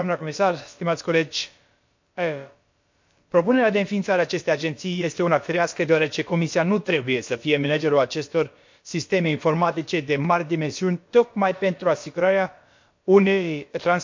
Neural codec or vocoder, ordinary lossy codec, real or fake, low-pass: codec, 16 kHz, about 1 kbps, DyCAST, with the encoder's durations; MP3, 48 kbps; fake; 7.2 kHz